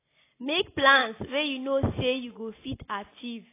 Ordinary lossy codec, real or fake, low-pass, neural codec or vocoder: AAC, 24 kbps; real; 3.6 kHz; none